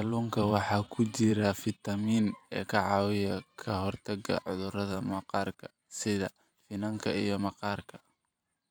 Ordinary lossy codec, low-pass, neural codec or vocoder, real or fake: none; none; vocoder, 44.1 kHz, 128 mel bands every 512 samples, BigVGAN v2; fake